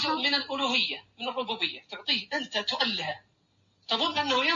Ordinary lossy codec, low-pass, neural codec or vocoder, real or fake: AAC, 64 kbps; 7.2 kHz; none; real